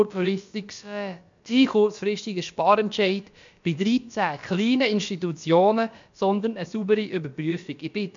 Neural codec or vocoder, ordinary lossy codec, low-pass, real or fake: codec, 16 kHz, about 1 kbps, DyCAST, with the encoder's durations; MP3, 64 kbps; 7.2 kHz; fake